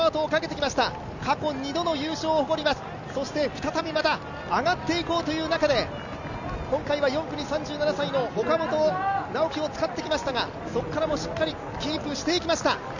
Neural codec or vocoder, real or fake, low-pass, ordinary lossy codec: none; real; 7.2 kHz; none